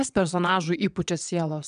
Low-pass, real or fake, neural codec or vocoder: 9.9 kHz; fake; vocoder, 22.05 kHz, 80 mel bands, WaveNeXt